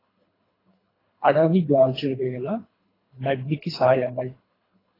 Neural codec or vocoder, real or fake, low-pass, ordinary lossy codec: codec, 24 kHz, 3 kbps, HILCodec; fake; 5.4 kHz; AAC, 24 kbps